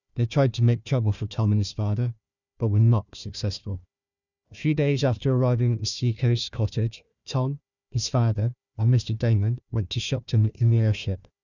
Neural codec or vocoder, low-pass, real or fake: codec, 16 kHz, 1 kbps, FunCodec, trained on Chinese and English, 50 frames a second; 7.2 kHz; fake